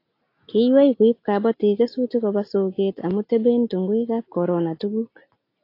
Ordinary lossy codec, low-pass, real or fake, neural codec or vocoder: AAC, 32 kbps; 5.4 kHz; real; none